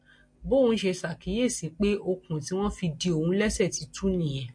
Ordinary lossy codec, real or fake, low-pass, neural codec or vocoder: MP3, 48 kbps; real; 9.9 kHz; none